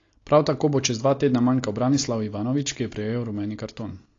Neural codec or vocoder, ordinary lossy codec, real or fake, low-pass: none; AAC, 32 kbps; real; 7.2 kHz